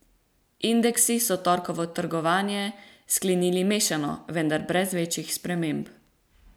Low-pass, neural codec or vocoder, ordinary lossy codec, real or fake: none; none; none; real